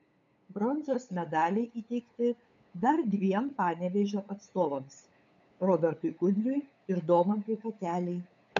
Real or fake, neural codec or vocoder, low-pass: fake; codec, 16 kHz, 8 kbps, FunCodec, trained on LibriTTS, 25 frames a second; 7.2 kHz